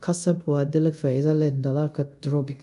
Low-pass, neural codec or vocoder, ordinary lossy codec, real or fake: 10.8 kHz; codec, 24 kHz, 0.5 kbps, DualCodec; none; fake